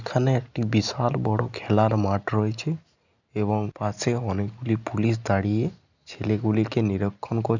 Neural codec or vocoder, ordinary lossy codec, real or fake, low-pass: none; none; real; 7.2 kHz